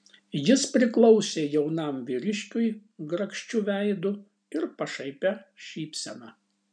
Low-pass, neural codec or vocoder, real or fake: 9.9 kHz; none; real